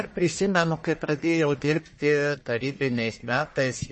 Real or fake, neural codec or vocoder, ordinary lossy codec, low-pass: fake; codec, 44.1 kHz, 1.7 kbps, Pupu-Codec; MP3, 32 kbps; 10.8 kHz